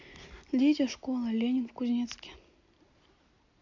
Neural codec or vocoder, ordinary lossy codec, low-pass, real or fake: none; AAC, 48 kbps; 7.2 kHz; real